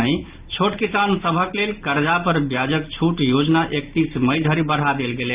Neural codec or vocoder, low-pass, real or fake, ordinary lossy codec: none; 3.6 kHz; real; Opus, 32 kbps